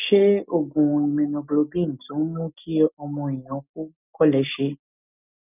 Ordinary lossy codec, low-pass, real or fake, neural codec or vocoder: none; 3.6 kHz; real; none